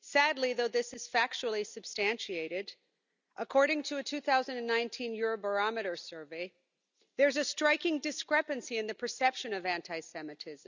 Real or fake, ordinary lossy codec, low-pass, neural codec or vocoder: real; none; 7.2 kHz; none